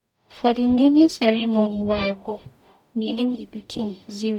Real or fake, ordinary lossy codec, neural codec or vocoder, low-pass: fake; none; codec, 44.1 kHz, 0.9 kbps, DAC; 19.8 kHz